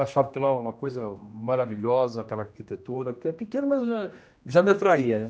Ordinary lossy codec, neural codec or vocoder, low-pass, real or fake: none; codec, 16 kHz, 1 kbps, X-Codec, HuBERT features, trained on general audio; none; fake